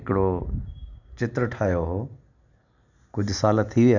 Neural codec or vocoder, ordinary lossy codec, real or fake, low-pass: none; none; real; 7.2 kHz